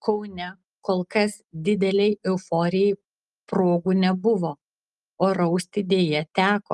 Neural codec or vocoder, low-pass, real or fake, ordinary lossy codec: none; 10.8 kHz; real; Opus, 32 kbps